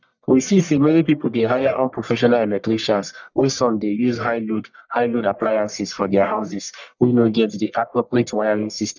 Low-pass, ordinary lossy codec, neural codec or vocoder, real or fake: 7.2 kHz; none; codec, 44.1 kHz, 1.7 kbps, Pupu-Codec; fake